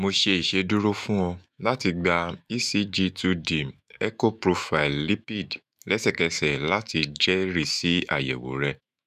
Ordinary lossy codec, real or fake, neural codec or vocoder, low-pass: none; fake; autoencoder, 48 kHz, 128 numbers a frame, DAC-VAE, trained on Japanese speech; 14.4 kHz